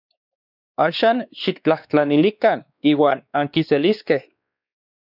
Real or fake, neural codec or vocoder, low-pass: fake; codec, 16 kHz, 2 kbps, X-Codec, WavLM features, trained on Multilingual LibriSpeech; 5.4 kHz